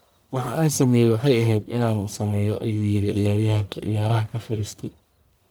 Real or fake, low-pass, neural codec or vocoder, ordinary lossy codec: fake; none; codec, 44.1 kHz, 1.7 kbps, Pupu-Codec; none